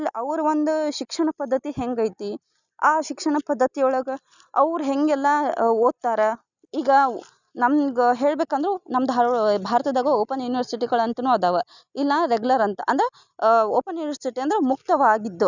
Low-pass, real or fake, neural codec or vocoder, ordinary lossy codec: 7.2 kHz; real; none; none